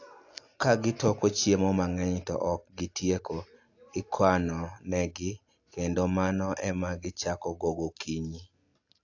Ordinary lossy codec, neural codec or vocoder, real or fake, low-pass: AAC, 48 kbps; none; real; 7.2 kHz